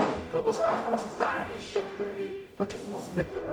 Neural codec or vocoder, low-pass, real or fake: codec, 44.1 kHz, 0.9 kbps, DAC; 19.8 kHz; fake